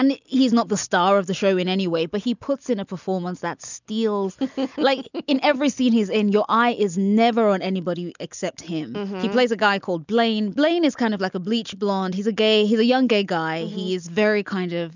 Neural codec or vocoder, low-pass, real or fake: none; 7.2 kHz; real